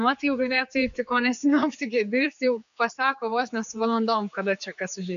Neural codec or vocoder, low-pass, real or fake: codec, 16 kHz, 4 kbps, X-Codec, HuBERT features, trained on general audio; 7.2 kHz; fake